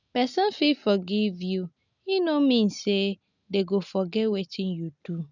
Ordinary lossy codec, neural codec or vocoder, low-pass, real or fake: none; none; 7.2 kHz; real